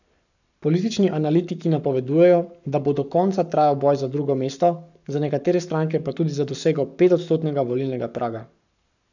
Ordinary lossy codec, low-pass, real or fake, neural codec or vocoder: none; 7.2 kHz; fake; codec, 44.1 kHz, 7.8 kbps, Pupu-Codec